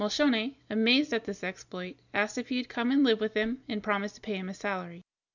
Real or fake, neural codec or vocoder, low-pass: real; none; 7.2 kHz